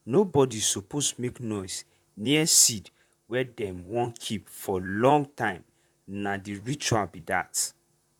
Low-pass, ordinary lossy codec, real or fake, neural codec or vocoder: 19.8 kHz; none; fake; vocoder, 44.1 kHz, 128 mel bands, Pupu-Vocoder